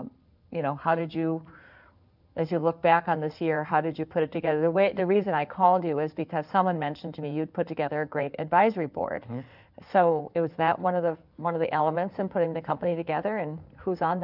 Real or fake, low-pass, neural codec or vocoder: fake; 5.4 kHz; codec, 16 kHz in and 24 kHz out, 2.2 kbps, FireRedTTS-2 codec